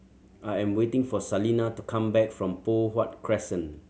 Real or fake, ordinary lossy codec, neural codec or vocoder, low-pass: real; none; none; none